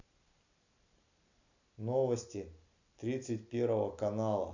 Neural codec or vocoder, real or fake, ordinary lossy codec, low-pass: none; real; none; 7.2 kHz